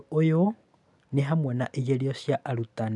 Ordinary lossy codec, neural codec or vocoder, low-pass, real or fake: none; none; 10.8 kHz; real